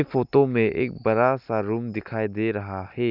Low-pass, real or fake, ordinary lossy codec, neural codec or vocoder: 5.4 kHz; real; none; none